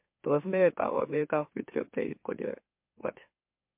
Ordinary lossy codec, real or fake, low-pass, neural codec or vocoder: MP3, 32 kbps; fake; 3.6 kHz; autoencoder, 44.1 kHz, a latent of 192 numbers a frame, MeloTTS